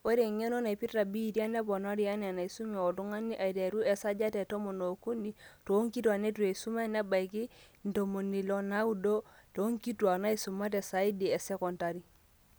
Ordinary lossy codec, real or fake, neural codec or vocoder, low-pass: none; real; none; none